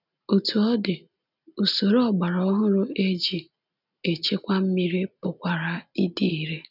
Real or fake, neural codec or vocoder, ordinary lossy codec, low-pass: real; none; none; 5.4 kHz